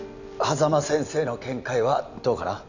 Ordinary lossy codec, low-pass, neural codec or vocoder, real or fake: none; 7.2 kHz; none; real